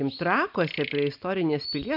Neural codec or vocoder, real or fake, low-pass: none; real; 5.4 kHz